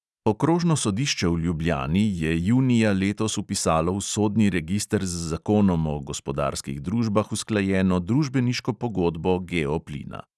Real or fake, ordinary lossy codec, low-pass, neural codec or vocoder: real; none; none; none